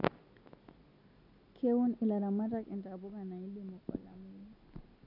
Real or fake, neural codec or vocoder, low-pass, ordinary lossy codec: real; none; 5.4 kHz; none